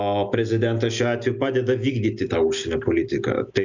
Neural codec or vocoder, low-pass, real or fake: none; 7.2 kHz; real